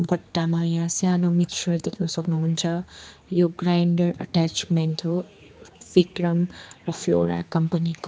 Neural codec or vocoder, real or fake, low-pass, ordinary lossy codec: codec, 16 kHz, 2 kbps, X-Codec, HuBERT features, trained on general audio; fake; none; none